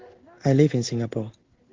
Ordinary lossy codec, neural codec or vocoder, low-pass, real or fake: Opus, 16 kbps; codec, 16 kHz in and 24 kHz out, 1 kbps, XY-Tokenizer; 7.2 kHz; fake